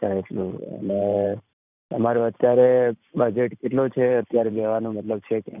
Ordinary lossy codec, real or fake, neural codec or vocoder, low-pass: none; fake; vocoder, 44.1 kHz, 128 mel bands every 512 samples, BigVGAN v2; 3.6 kHz